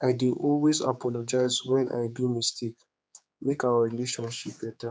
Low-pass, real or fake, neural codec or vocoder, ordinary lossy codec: none; fake; codec, 16 kHz, 4 kbps, X-Codec, HuBERT features, trained on general audio; none